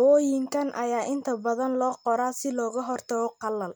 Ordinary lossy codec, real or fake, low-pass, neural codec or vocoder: none; real; none; none